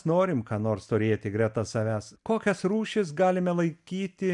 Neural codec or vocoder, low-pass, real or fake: none; 10.8 kHz; real